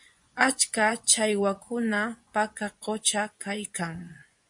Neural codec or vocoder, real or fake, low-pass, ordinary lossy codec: vocoder, 24 kHz, 100 mel bands, Vocos; fake; 10.8 kHz; MP3, 64 kbps